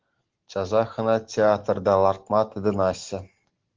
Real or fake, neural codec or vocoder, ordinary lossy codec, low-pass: real; none; Opus, 16 kbps; 7.2 kHz